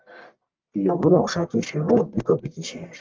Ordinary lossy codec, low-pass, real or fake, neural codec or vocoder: Opus, 32 kbps; 7.2 kHz; fake; codec, 44.1 kHz, 1.7 kbps, Pupu-Codec